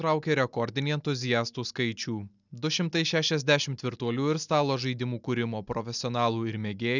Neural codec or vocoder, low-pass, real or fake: none; 7.2 kHz; real